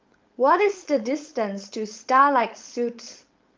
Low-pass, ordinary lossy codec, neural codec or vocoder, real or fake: 7.2 kHz; Opus, 24 kbps; codec, 16 kHz, 4.8 kbps, FACodec; fake